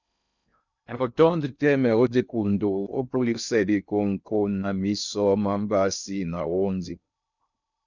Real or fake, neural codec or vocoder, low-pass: fake; codec, 16 kHz in and 24 kHz out, 0.6 kbps, FocalCodec, streaming, 2048 codes; 7.2 kHz